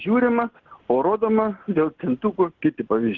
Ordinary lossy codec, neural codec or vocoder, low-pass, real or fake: Opus, 16 kbps; none; 7.2 kHz; real